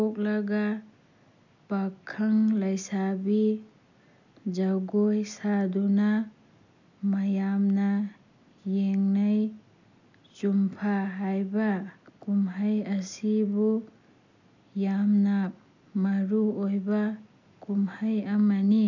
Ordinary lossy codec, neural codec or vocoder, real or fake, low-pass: none; none; real; 7.2 kHz